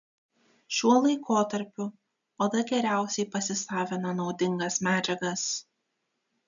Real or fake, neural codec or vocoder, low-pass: real; none; 7.2 kHz